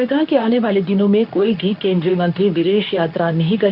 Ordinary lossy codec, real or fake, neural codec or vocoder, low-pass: none; fake; codec, 24 kHz, 0.9 kbps, WavTokenizer, medium speech release version 2; 5.4 kHz